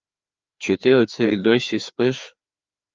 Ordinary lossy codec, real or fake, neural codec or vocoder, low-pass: Opus, 24 kbps; fake; codec, 16 kHz, 2 kbps, FreqCodec, larger model; 7.2 kHz